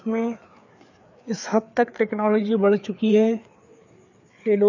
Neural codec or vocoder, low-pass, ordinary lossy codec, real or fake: codec, 16 kHz, 4 kbps, FreqCodec, larger model; 7.2 kHz; none; fake